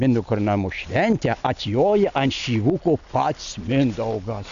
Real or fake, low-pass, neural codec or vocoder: real; 7.2 kHz; none